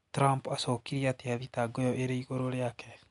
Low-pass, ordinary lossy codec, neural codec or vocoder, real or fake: 10.8 kHz; MP3, 64 kbps; none; real